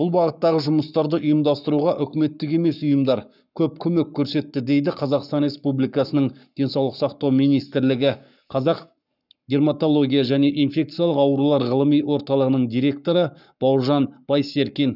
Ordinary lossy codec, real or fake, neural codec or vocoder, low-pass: none; fake; codec, 44.1 kHz, 7.8 kbps, Pupu-Codec; 5.4 kHz